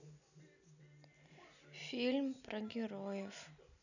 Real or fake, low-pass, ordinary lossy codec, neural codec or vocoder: real; 7.2 kHz; none; none